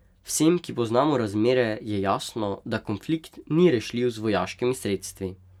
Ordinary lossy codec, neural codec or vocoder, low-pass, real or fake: none; none; 19.8 kHz; real